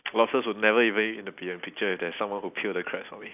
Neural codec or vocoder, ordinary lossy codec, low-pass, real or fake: none; none; 3.6 kHz; real